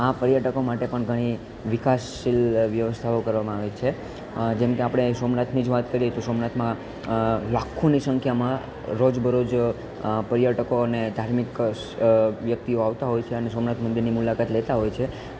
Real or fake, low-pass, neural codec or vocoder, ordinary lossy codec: real; none; none; none